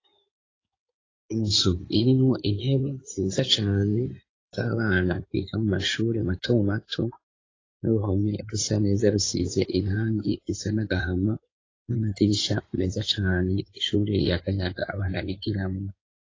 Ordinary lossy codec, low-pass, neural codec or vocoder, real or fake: AAC, 32 kbps; 7.2 kHz; codec, 16 kHz in and 24 kHz out, 2.2 kbps, FireRedTTS-2 codec; fake